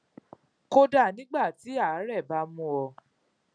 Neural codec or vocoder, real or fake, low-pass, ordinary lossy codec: none; real; 9.9 kHz; none